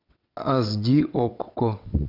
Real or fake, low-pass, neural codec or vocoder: fake; 5.4 kHz; vocoder, 22.05 kHz, 80 mel bands, WaveNeXt